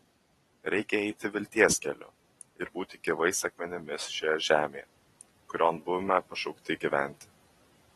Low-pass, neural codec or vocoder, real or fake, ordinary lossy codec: 19.8 kHz; vocoder, 44.1 kHz, 128 mel bands every 256 samples, BigVGAN v2; fake; AAC, 32 kbps